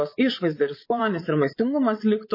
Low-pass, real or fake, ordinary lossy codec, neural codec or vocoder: 5.4 kHz; fake; MP3, 24 kbps; vocoder, 22.05 kHz, 80 mel bands, Vocos